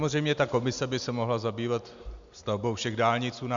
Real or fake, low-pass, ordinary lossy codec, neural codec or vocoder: real; 7.2 kHz; MP3, 64 kbps; none